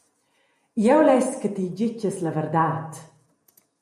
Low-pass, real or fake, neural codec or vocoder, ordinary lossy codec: 14.4 kHz; fake; vocoder, 48 kHz, 128 mel bands, Vocos; MP3, 64 kbps